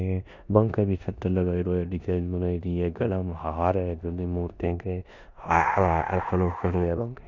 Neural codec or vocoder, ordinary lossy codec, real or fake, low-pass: codec, 16 kHz in and 24 kHz out, 0.9 kbps, LongCat-Audio-Codec, four codebook decoder; none; fake; 7.2 kHz